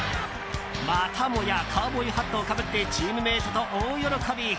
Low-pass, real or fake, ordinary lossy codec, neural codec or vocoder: none; real; none; none